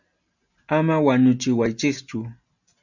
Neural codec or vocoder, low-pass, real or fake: none; 7.2 kHz; real